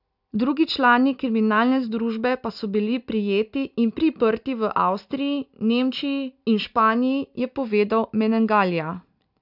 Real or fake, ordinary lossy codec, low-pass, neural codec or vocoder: real; none; 5.4 kHz; none